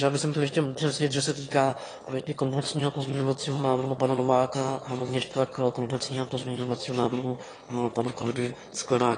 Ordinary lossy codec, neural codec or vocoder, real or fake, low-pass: AAC, 32 kbps; autoencoder, 22.05 kHz, a latent of 192 numbers a frame, VITS, trained on one speaker; fake; 9.9 kHz